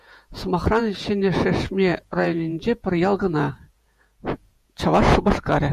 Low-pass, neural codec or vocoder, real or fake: 14.4 kHz; vocoder, 48 kHz, 128 mel bands, Vocos; fake